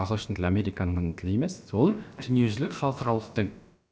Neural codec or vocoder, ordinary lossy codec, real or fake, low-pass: codec, 16 kHz, about 1 kbps, DyCAST, with the encoder's durations; none; fake; none